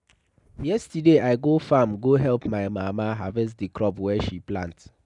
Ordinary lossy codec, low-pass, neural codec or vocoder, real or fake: none; 10.8 kHz; none; real